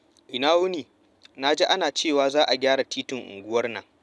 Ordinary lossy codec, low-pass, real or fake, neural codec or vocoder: none; none; real; none